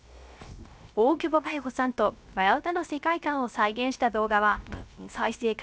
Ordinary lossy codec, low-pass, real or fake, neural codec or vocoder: none; none; fake; codec, 16 kHz, 0.3 kbps, FocalCodec